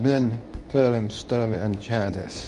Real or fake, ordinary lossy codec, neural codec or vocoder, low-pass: fake; MP3, 96 kbps; codec, 24 kHz, 0.9 kbps, WavTokenizer, medium speech release version 2; 10.8 kHz